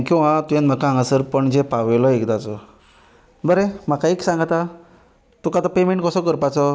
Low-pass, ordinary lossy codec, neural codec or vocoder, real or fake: none; none; none; real